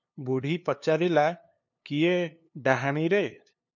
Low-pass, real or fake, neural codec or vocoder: 7.2 kHz; fake; codec, 16 kHz, 2 kbps, FunCodec, trained on LibriTTS, 25 frames a second